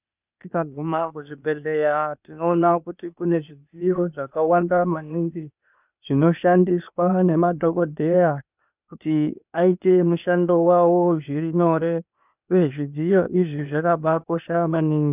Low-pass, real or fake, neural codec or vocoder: 3.6 kHz; fake; codec, 16 kHz, 0.8 kbps, ZipCodec